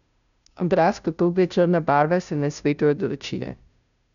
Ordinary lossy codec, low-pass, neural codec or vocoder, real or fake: none; 7.2 kHz; codec, 16 kHz, 0.5 kbps, FunCodec, trained on Chinese and English, 25 frames a second; fake